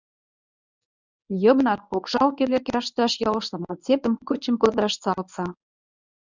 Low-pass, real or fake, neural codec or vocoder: 7.2 kHz; fake; codec, 24 kHz, 0.9 kbps, WavTokenizer, medium speech release version 1